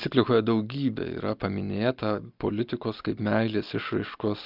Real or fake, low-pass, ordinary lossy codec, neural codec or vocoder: real; 5.4 kHz; Opus, 24 kbps; none